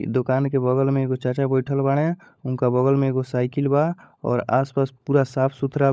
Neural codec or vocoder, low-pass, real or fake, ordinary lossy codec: codec, 16 kHz, 16 kbps, FunCodec, trained on LibriTTS, 50 frames a second; none; fake; none